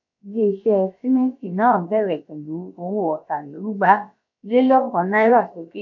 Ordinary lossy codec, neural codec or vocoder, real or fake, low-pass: none; codec, 16 kHz, about 1 kbps, DyCAST, with the encoder's durations; fake; 7.2 kHz